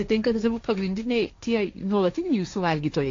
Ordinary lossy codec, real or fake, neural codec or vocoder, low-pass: AAC, 48 kbps; fake; codec, 16 kHz, 1.1 kbps, Voila-Tokenizer; 7.2 kHz